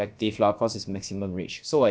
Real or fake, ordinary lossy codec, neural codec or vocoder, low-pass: fake; none; codec, 16 kHz, about 1 kbps, DyCAST, with the encoder's durations; none